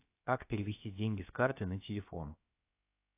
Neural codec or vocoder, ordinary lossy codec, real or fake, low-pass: codec, 16 kHz, about 1 kbps, DyCAST, with the encoder's durations; AAC, 24 kbps; fake; 3.6 kHz